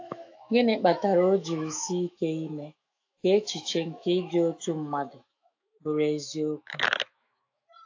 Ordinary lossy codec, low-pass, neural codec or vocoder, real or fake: AAC, 48 kbps; 7.2 kHz; autoencoder, 48 kHz, 128 numbers a frame, DAC-VAE, trained on Japanese speech; fake